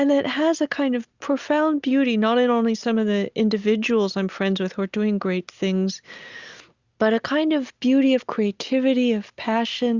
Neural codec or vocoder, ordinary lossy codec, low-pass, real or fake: none; Opus, 64 kbps; 7.2 kHz; real